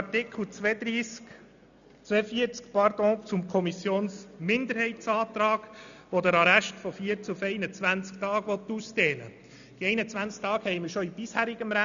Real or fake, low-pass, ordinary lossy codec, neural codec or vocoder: real; 7.2 kHz; none; none